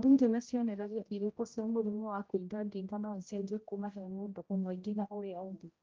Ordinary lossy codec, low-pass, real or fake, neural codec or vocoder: Opus, 24 kbps; 7.2 kHz; fake; codec, 16 kHz, 0.5 kbps, X-Codec, HuBERT features, trained on general audio